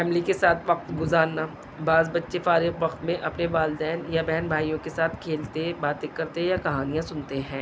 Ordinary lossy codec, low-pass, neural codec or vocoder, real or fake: none; none; none; real